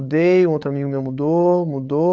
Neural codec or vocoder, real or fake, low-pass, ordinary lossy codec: codec, 16 kHz, 8 kbps, FreqCodec, larger model; fake; none; none